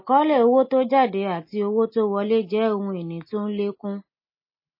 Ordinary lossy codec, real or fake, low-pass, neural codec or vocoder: MP3, 24 kbps; real; 5.4 kHz; none